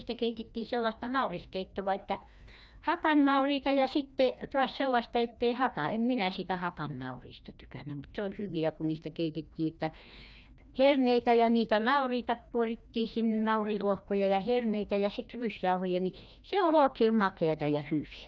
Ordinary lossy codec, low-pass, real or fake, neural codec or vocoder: none; none; fake; codec, 16 kHz, 1 kbps, FreqCodec, larger model